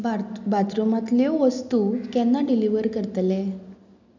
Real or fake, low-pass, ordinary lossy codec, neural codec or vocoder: real; 7.2 kHz; none; none